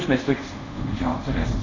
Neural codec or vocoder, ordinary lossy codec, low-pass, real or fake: codec, 24 kHz, 0.5 kbps, DualCodec; AAC, 32 kbps; 7.2 kHz; fake